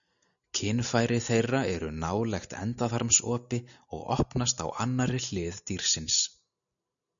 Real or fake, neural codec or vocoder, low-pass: real; none; 7.2 kHz